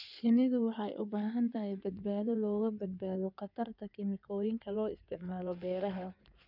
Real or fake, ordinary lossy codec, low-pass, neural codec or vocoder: fake; none; 5.4 kHz; codec, 16 kHz in and 24 kHz out, 2.2 kbps, FireRedTTS-2 codec